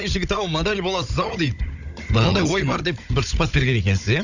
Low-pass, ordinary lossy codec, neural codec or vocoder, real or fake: 7.2 kHz; none; codec, 16 kHz, 16 kbps, FunCodec, trained on Chinese and English, 50 frames a second; fake